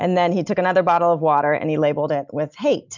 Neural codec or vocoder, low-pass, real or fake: none; 7.2 kHz; real